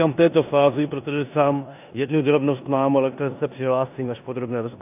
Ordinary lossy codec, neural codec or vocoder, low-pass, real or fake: AAC, 32 kbps; codec, 16 kHz in and 24 kHz out, 0.9 kbps, LongCat-Audio-Codec, four codebook decoder; 3.6 kHz; fake